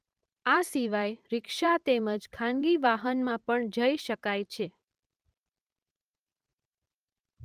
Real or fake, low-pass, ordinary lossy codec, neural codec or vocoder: fake; 14.4 kHz; Opus, 32 kbps; vocoder, 44.1 kHz, 128 mel bands every 512 samples, BigVGAN v2